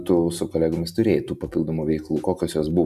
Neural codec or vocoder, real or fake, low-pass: none; real; 14.4 kHz